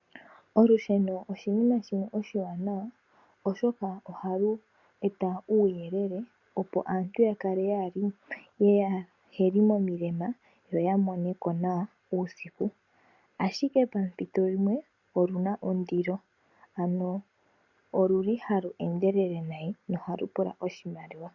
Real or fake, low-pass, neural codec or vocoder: real; 7.2 kHz; none